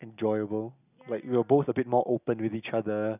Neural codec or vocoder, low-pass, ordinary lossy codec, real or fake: none; 3.6 kHz; none; real